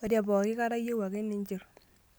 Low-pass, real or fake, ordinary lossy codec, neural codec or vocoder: none; real; none; none